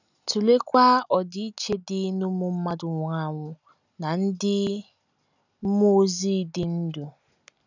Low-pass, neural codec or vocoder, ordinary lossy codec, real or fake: 7.2 kHz; none; none; real